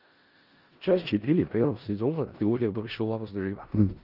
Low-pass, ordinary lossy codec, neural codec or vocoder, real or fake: 5.4 kHz; Opus, 24 kbps; codec, 16 kHz in and 24 kHz out, 0.4 kbps, LongCat-Audio-Codec, four codebook decoder; fake